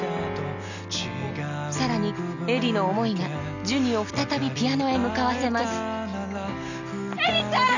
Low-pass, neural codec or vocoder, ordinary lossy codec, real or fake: 7.2 kHz; none; none; real